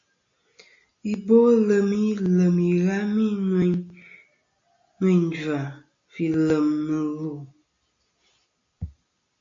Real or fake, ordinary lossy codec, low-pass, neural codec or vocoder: real; AAC, 48 kbps; 7.2 kHz; none